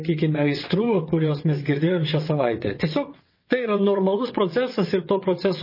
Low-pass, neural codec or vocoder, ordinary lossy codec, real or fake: 5.4 kHz; vocoder, 22.05 kHz, 80 mel bands, WaveNeXt; MP3, 24 kbps; fake